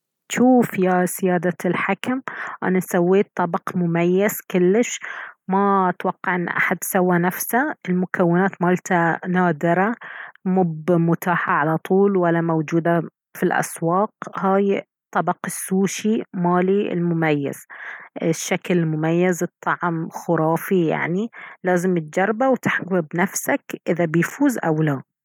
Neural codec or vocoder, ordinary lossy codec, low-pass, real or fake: none; none; 19.8 kHz; real